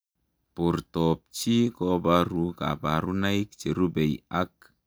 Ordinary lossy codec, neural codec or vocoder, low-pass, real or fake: none; none; none; real